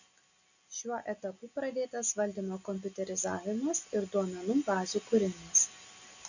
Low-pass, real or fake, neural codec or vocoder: 7.2 kHz; real; none